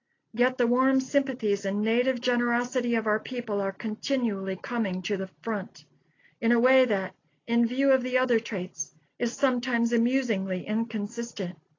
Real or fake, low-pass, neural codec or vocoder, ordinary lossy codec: real; 7.2 kHz; none; AAC, 32 kbps